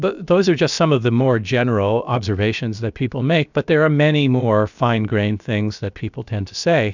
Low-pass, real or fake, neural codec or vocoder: 7.2 kHz; fake; codec, 16 kHz, about 1 kbps, DyCAST, with the encoder's durations